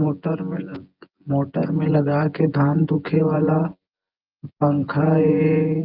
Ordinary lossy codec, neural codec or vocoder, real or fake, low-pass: Opus, 24 kbps; vocoder, 24 kHz, 100 mel bands, Vocos; fake; 5.4 kHz